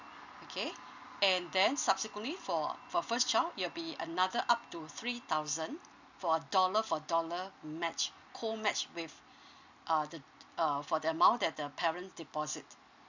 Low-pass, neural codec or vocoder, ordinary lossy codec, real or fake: 7.2 kHz; none; none; real